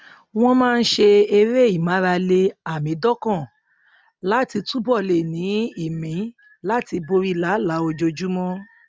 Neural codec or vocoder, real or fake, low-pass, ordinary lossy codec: none; real; none; none